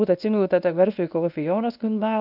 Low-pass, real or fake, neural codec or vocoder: 5.4 kHz; fake; codec, 24 kHz, 0.9 kbps, WavTokenizer, medium speech release version 1